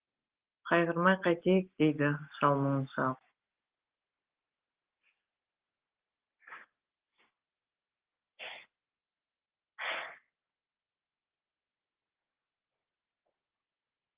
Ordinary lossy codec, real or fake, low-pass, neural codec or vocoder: Opus, 16 kbps; real; 3.6 kHz; none